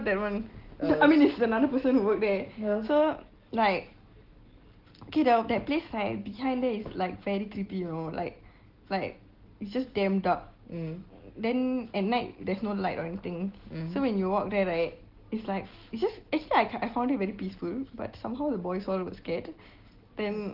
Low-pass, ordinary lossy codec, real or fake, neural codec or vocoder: 5.4 kHz; Opus, 16 kbps; real; none